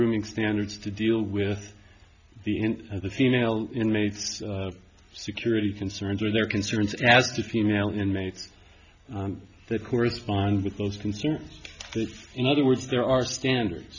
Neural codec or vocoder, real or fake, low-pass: none; real; 7.2 kHz